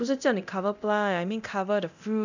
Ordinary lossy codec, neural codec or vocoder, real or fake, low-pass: none; codec, 24 kHz, 0.9 kbps, DualCodec; fake; 7.2 kHz